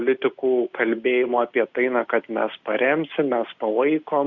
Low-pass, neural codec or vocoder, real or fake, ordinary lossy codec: 7.2 kHz; none; real; Opus, 64 kbps